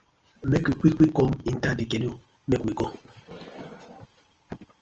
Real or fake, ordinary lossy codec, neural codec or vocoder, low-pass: real; Opus, 24 kbps; none; 7.2 kHz